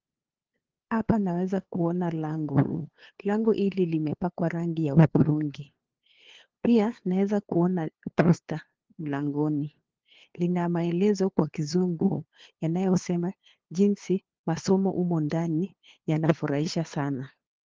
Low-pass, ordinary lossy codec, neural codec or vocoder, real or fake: 7.2 kHz; Opus, 16 kbps; codec, 16 kHz, 2 kbps, FunCodec, trained on LibriTTS, 25 frames a second; fake